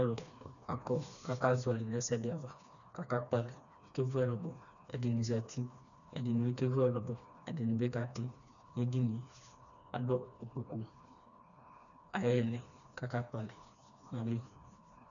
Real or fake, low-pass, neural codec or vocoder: fake; 7.2 kHz; codec, 16 kHz, 2 kbps, FreqCodec, smaller model